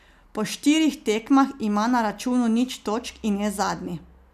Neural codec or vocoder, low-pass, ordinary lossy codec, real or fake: none; 14.4 kHz; none; real